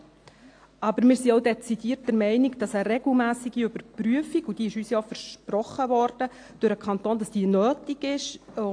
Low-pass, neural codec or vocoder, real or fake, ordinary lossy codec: 9.9 kHz; none; real; AAC, 48 kbps